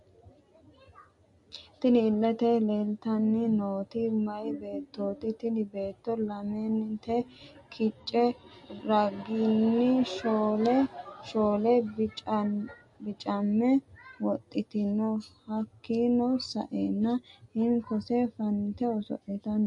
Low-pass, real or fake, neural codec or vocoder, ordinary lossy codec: 10.8 kHz; real; none; MP3, 48 kbps